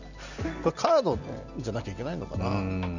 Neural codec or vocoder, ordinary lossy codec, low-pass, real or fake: none; none; 7.2 kHz; real